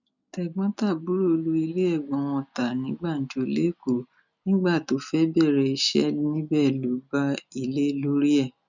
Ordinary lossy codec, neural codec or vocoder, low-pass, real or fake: none; none; 7.2 kHz; real